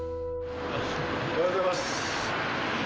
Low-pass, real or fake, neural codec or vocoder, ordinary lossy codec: none; real; none; none